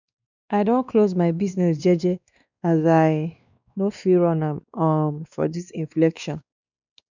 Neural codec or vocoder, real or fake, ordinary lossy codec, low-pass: codec, 16 kHz, 2 kbps, X-Codec, WavLM features, trained on Multilingual LibriSpeech; fake; none; 7.2 kHz